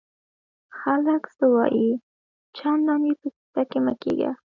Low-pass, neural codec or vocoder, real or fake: 7.2 kHz; none; real